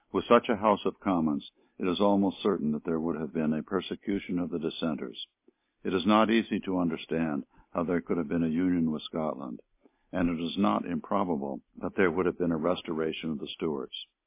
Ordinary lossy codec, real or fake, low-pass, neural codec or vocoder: MP3, 24 kbps; real; 3.6 kHz; none